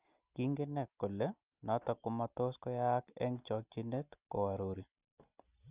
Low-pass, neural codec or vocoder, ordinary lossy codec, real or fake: 3.6 kHz; none; Opus, 24 kbps; real